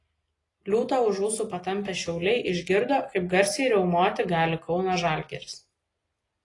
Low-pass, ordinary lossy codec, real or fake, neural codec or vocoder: 10.8 kHz; AAC, 32 kbps; real; none